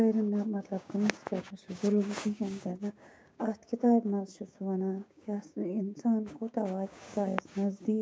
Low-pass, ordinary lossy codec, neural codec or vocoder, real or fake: none; none; codec, 16 kHz, 6 kbps, DAC; fake